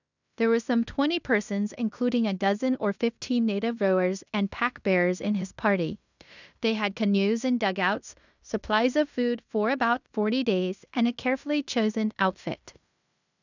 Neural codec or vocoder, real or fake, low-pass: codec, 16 kHz in and 24 kHz out, 0.9 kbps, LongCat-Audio-Codec, fine tuned four codebook decoder; fake; 7.2 kHz